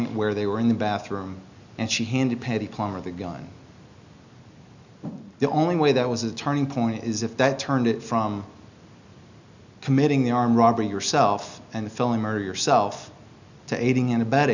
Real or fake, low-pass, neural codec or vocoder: real; 7.2 kHz; none